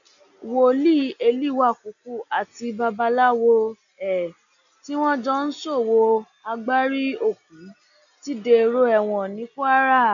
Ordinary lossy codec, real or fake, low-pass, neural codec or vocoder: none; real; 7.2 kHz; none